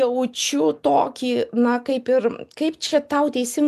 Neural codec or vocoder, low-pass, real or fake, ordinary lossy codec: none; 14.4 kHz; real; MP3, 96 kbps